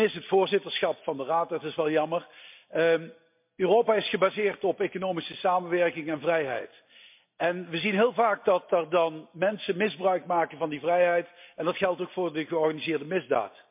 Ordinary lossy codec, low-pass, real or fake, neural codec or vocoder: MP3, 32 kbps; 3.6 kHz; real; none